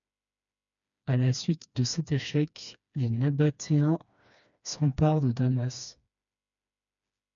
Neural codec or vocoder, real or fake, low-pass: codec, 16 kHz, 2 kbps, FreqCodec, smaller model; fake; 7.2 kHz